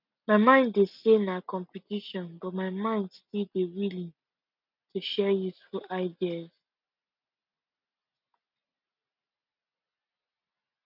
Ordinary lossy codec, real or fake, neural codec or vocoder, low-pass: none; real; none; 5.4 kHz